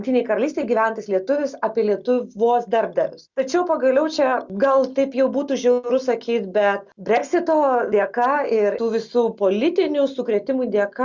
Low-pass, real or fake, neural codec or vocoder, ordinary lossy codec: 7.2 kHz; real; none; Opus, 64 kbps